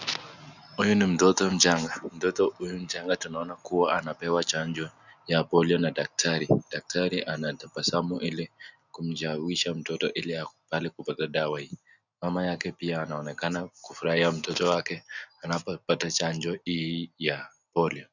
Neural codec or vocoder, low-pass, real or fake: none; 7.2 kHz; real